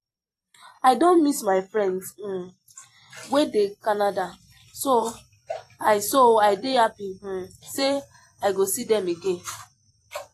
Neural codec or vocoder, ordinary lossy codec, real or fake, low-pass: vocoder, 44.1 kHz, 128 mel bands every 256 samples, BigVGAN v2; AAC, 48 kbps; fake; 14.4 kHz